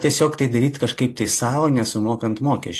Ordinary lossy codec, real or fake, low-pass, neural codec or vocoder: AAC, 48 kbps; real; 14.4 kHz; none